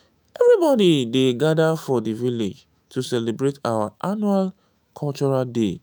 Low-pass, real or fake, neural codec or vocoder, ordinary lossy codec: none; fake; autoencoder, 48 kHz, 128 numbers a frame, DAC-VAE, trained on Japanese speech; none